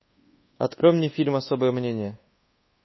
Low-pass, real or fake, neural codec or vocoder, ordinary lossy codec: 7.2 kHz; fake; codec, 24 kHz, 1.2 kbps, DualCodec; MP3, 24 kbps